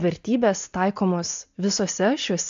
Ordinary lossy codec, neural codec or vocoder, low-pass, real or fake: AAC, 64 kbps; none; 7.2 kHz; real